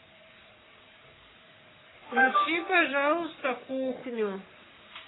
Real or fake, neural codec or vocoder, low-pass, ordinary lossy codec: fake; codec, 44.1 kHz, 3.4 kbps, Pupu-Codec; 7.2 kHz; AAC, 16 kbps